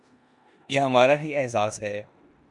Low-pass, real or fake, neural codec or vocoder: 10.8 kHz; fake; codec, 16 kHz in and 24 kHz out, 0.9 kbps, LongCat-Audio-Codec, four codebook decoder